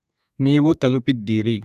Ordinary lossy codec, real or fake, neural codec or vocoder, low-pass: none; fake; codec, 32 kHz, 1.9 kbps, SNAC; 14.4 kHz